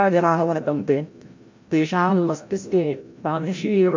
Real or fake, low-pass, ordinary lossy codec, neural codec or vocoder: fake; 7.2 kHz; MP3, 48 kbps; codec, 16 kHz, 0.5 kbps, FreqCodec, larger model